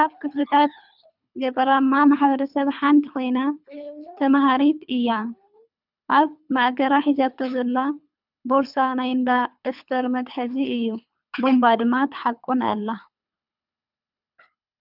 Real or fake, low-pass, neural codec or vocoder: fake; 5.4 kHz; codec, 24 kHz, 3 kbps, HILCodec